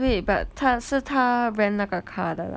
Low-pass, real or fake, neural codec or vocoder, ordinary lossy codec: none; real; none; none